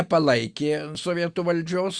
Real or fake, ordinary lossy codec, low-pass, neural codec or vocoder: real; Opus, 64 kbps; 9.9 kHz; none